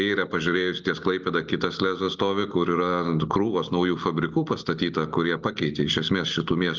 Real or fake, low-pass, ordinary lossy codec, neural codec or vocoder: real; 7.2 kHz; Opus, 32 kbps; none